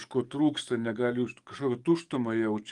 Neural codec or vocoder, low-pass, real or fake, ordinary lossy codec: codec, 44.1 kHz, 7.8 kbps, DAC; 10.8 kHz; fake; Opus, 32 kbps